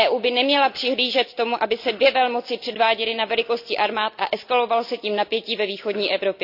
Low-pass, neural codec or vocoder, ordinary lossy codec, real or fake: 5.4 kHz; none; AAC, 48 kbps; real